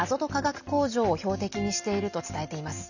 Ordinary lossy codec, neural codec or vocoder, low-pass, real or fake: none; none; 7.2 kHz; real